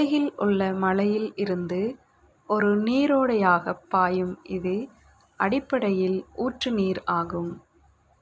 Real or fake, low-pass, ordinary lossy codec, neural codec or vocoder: real; none; none; none